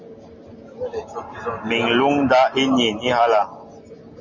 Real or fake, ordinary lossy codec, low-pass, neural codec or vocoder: real; MP3, 32 kbps; 7.2 kHz; none